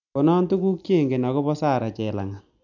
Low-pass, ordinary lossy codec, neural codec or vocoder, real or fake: 7.2 kHz; none; none; real